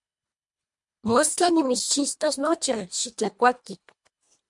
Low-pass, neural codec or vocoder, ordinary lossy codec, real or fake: 10.8 kHz; codec, 24 kHz, 1.5 kbps, HILCodec; MP3, 64 kbps; fake